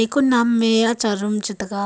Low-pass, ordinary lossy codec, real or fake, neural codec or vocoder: none; none; real; none